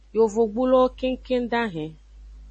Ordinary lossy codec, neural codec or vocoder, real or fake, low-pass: MP3, 32 kbps; none; real; 10.8 kHz